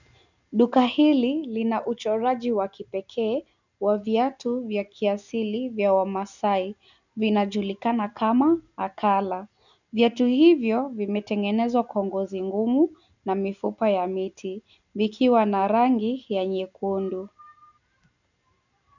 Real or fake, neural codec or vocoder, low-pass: real; none; 7.2 kHz